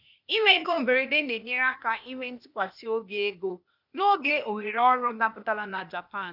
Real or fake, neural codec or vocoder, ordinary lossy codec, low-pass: fake; codec, 16 kHz, 0.8 kbps, ZipCodec; none; 5.4 kHz